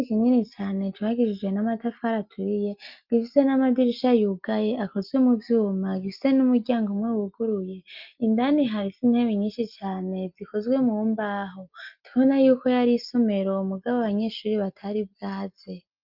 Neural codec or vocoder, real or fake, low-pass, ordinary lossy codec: none; real; 5.4 kHz; Opus, 24 kbps